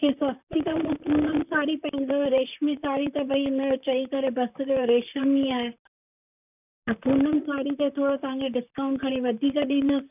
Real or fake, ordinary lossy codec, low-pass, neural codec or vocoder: fake; none; 3.6 kHz; vocoder, 44.1 kHz, 128 mel bands, Pupu-Vocoder